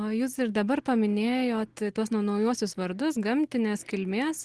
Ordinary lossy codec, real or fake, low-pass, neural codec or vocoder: Opus, 16 kbps; real; 10.8 kHz; none